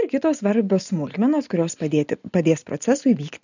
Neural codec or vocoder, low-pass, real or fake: none; 7.2 kHz; real